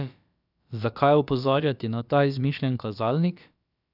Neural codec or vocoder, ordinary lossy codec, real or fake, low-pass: codec, 16 kHz, about 1 kbps, DyCAST, with the encoder's durations; none; fake; 5.4 kHz